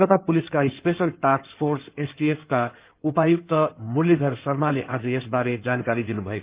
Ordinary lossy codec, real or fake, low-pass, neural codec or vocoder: Opus, 32 kbps; fake; 3.6 kHz; codec, 16 kHz in and 24 kHz out, 2.2 kbps, FireRedTTS-2 codec